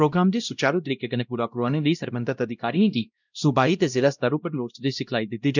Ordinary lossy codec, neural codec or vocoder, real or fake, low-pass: none; codec, 16 kHz, 0.5 kbps, X-Codec, WavLM features, trained on Multilingual LibriSpeech; fake; 7.2 kHz